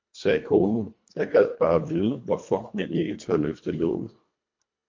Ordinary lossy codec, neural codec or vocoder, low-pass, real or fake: MP3, 48 kbps; codec, 24 kHz, 1.5 kbps, HILCodec; 7.2 kHz; fake